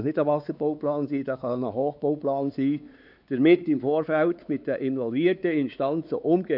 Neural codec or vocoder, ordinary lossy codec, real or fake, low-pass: codec, 16 kHz, 4 kbps, X-Codec, WavLM features, trained on Multilingual LibriSpeech; none; fake; 5.4 kHz